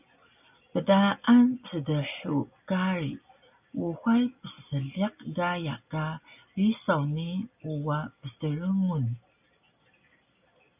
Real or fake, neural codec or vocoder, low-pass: real; none; 3.6 kHz